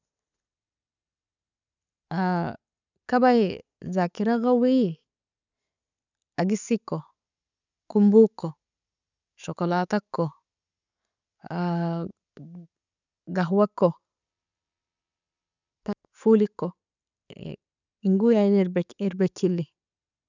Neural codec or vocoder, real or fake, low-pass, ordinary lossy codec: none; real; 7.2 kHz; none